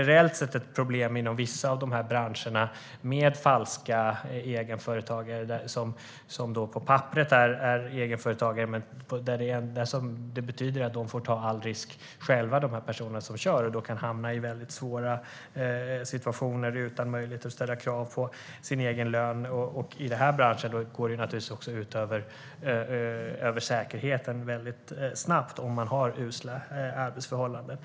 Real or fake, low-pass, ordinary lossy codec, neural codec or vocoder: real; none; none; none